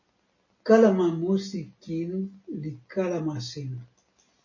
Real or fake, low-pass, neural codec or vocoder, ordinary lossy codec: real; 7.2 kHz; none; MP3, 32 kbps